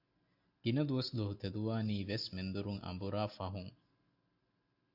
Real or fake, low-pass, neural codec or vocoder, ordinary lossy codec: real; 5.4 kHz; none; AAC, 48 kbps